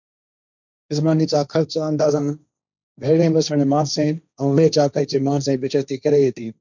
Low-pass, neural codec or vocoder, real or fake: 7.2 kHz; codec, 16 kHz, 1.1 kbps, Voila-Tokenizer; fake